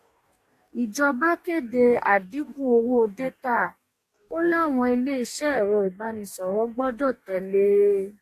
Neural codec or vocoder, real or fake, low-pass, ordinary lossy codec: codec, 44.1 kHz, 2.6 kbps, DAC; fake; 14.4 kHz; AAC, 64 kbps